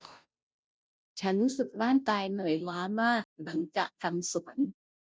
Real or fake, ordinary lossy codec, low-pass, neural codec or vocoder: fake; none; none; codec, 16 kHz, 0.5 kbps, FunCodec, trained on Chinese and English, 25 frames a second